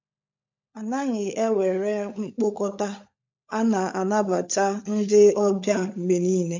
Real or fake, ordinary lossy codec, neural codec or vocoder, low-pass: fake; MP3, 48 kbps; codec, 16 kHz, 16 kbps, FunCodec, trained on LibriTTS, 50 frames a second; 7.2 kHz